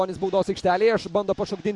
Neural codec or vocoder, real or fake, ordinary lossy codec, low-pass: none; real; MP3, 64 kbps; 10.8 kHz